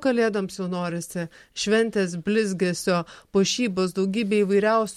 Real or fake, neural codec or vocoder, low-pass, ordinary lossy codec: real; none; 19.8 kHz; MP3, 64 kbps